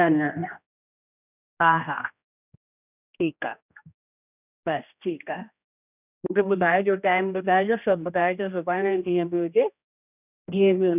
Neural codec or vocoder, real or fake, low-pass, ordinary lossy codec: codec, 16 kHz, 1 kbps, X-Codec, HuBERT features, trained on general audio; fake; 3.6 kHz; none